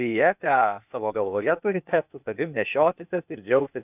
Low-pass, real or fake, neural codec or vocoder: 3.6 kHz; fake; codec, 16 kHz, 0.8 kbps, ZipCodec